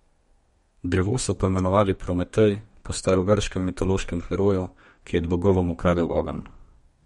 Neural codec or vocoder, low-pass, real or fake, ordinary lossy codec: codec, 32 kHz, 1.9 kbps, SNAC; 14.4 kHz; fake; MP3, 48 kbps